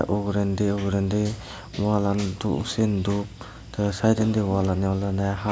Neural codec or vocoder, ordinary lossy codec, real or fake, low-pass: none; none; real; none